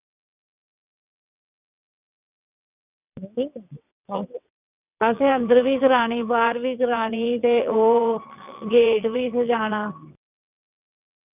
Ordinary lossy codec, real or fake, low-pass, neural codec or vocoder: none; fake; 3.6 kHz; vocoder, 22.05 kHz, 80 mel bands, WaveNeXt